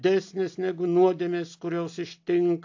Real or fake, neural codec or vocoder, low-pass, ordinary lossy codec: real; none; 7.2 kHz; AAC, 48 kbps